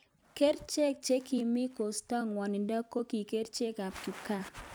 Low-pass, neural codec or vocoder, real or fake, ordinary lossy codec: none; vocoder, 44.1 kHz, 128 mel bands every 256 samples, BigVGAN v2; fake; none